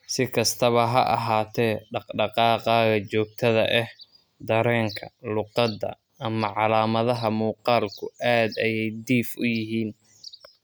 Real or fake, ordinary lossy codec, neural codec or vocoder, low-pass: real; none; none; none